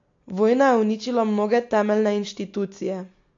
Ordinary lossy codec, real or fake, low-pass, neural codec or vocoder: AAC, 48 kbps; real; 7.2 kHz; none